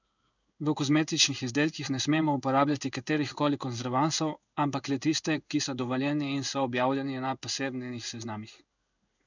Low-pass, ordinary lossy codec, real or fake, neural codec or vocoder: 7.2 kHz; none; fake; codec, 16 kHz in and 24 kHz out, 1 kbps, XY-Tokenizer